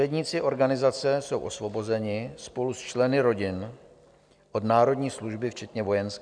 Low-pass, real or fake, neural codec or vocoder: 9.9 kHz; real; none